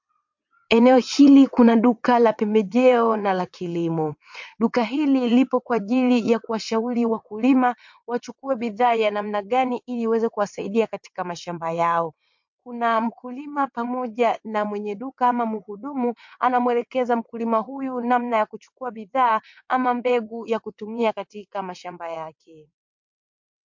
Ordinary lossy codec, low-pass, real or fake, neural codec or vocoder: MP3, 48 kbps; 7.2 kHz; fake; vocoder, 22.05 kHz, 80 mel bands, WaveNeXt